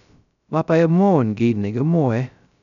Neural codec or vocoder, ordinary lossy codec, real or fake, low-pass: codec, 16 kHz, 0.2 kbps, FocalCodec; none; fake; 7.2 kHz